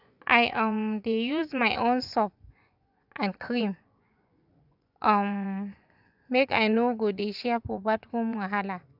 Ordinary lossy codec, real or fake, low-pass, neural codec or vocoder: none; fake; 5.4 kHz; vocoder, 24 kHz, 100 mel bands, Vocos